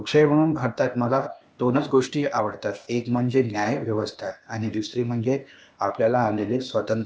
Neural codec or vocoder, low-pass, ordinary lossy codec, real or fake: codec, 16 kHz, 0.8 kbps, ZipCodec; none; none; fake